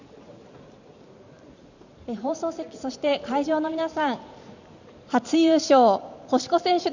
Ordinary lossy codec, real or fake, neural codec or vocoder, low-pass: none; fake; vocoder, 44.1 kHz, 128 mel bands every 512 samples, BigVGAN v2; 7.2 kHz